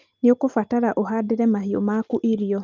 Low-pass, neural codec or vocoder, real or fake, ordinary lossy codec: 7.2 kHz; none; real; Opus, 32 kbps